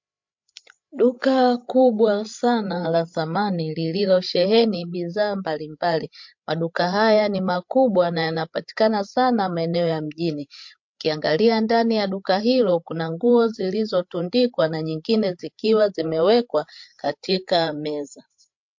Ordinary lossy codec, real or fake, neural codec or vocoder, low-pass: MP3, 48 kbps; fake; codec, 16 kHz, 8 kbps, FreqCodec, larger model; 7.2 kHz